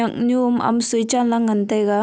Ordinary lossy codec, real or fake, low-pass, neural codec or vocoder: none; real; none; none